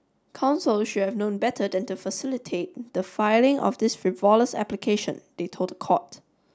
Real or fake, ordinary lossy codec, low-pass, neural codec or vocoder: real; none; none; none